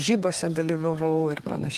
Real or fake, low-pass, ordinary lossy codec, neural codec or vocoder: fake; 14.4 kHz; Opus, 32 kbps; codec, 32 kHz, 1.9 kbps, SNAC